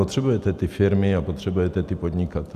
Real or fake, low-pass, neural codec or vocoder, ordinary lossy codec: real; 14.4 kHz; none; MP3, 96 kbps